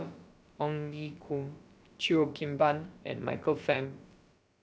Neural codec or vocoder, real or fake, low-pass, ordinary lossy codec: codec, 16 kHz, about 1 kbps, DyCAST, with the encoder's durations; fake; none; none